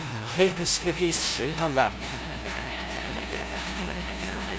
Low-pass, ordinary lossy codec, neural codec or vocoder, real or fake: none; none; codec, 16 kHz, 0.5 kbps, FunCodec, trained on LibriTTS, 25 frames a second; fake